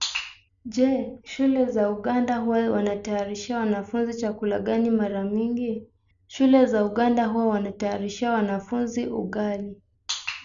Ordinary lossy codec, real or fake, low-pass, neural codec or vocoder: none; real; 7.2 kHz; none